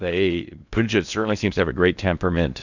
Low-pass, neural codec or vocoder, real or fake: 7.2 kHz; codec, 16 kHz in and 24 kHz out, 0.6 kbps, FocalCodec, streaming, 2048 codes; fake